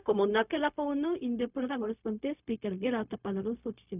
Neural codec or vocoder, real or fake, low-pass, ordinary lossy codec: codec, 16 kHz, 0.4 kbps, LongCat-Audio-Codec; fake; 3.6 kHz; none